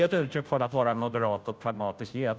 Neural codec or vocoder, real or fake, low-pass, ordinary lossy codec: codec, 16 kHz, 0.5 kbps, FunCodec, trained on Chinese and English, 25 frames a second; fake; none; none